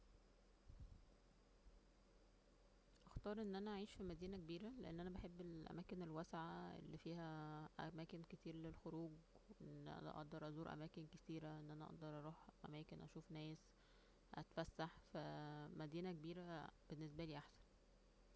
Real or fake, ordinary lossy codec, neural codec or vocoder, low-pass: real; none; none; none